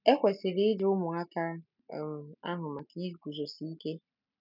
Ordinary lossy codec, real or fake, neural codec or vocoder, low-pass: none; real; none; 5.4 kHz